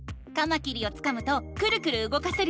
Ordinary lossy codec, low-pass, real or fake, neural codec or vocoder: none; none; real; none